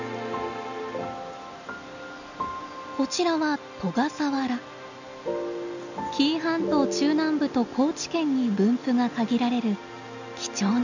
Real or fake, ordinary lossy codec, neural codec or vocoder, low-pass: real; none; none; 7.2 kHz